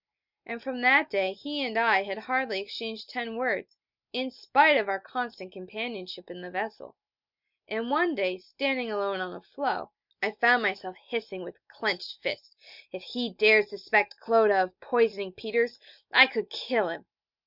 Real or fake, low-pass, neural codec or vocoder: real; 5.4 kHz; none